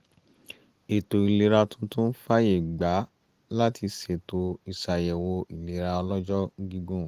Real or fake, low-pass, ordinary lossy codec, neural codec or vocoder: real; 14.4 kHz; Opus, 24 kbps; none